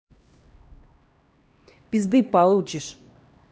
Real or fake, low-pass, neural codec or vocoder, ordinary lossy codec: fake; none; codec, 16 kHz, 1 kbps, X-Codec, HuBERT features, trained on LibriSpeech; none